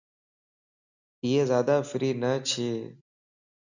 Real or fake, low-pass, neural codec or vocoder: real; 7.2 kHz; none